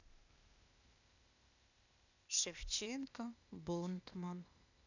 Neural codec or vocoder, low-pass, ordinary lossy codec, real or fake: codec, 16 kHz, 0.8 kbps, ZipCodec; 7.2 kHz; none; fake